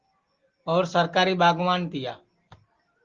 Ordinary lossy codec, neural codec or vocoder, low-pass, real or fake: Opus, 16 kbps; none; 7.2 kHz; real